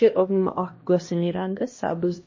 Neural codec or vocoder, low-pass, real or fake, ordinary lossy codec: codec, 16 kHz, 1 kbps, X-Codec, HuBERT features, trained on LibriSpeech; 7.2 kHz; fake; MP3, 32 kbps